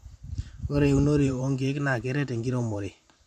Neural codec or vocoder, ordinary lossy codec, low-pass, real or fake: vocoder, 44.1 kHz, 128 mel bands every 512 samples, BigVGAN v2; MP3, 64 kbps; 14.4 kHz; fake